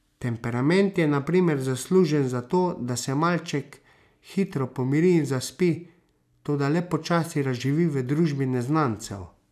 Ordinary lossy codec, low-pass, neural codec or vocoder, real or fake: none; 14.4 kHz; none; real